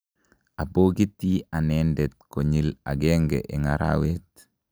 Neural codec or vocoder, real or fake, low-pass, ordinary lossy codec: none; real; none; none